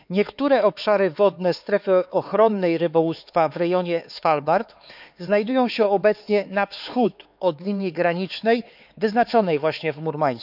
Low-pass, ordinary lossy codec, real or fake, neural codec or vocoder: 5.4 kHz; none; fake; codec, 16 kHz, 4 kbps, X-Codec, HuBERT features, trained on LibriSpeech